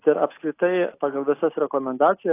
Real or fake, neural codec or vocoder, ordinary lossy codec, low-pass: real; none; AAC, 24 kbps; 3.6 kHz